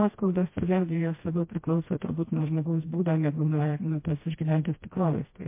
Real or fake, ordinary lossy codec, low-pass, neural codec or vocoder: fake; MP3, 24 kbps; 3.6 kHz; codec, 16 kHz, 1 kbps, FreqCodec, smaller model